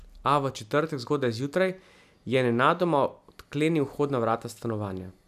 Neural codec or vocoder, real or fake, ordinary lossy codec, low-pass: none; real; none; 14.4 kHz